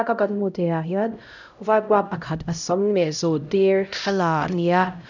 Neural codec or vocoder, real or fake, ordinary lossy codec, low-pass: codec, 16 kHz, 0.5 kbps, X-Codec, HuBERT features, trained on LibriSpeech; fake; none; 7.2 kHz